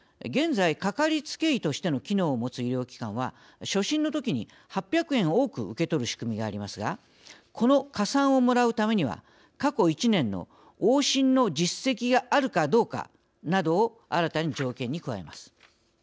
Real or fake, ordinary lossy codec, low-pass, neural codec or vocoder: real; none; none; none